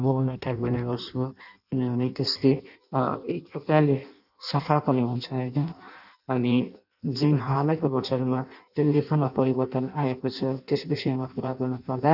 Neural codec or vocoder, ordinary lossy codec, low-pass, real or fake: codec, 16 kHz in and 24 kHz out, 0.6 kbps, FireRedTTS-2 codec; none; 5.4 kHz; fake